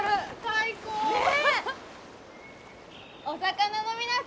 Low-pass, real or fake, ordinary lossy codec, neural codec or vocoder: none; real; none; none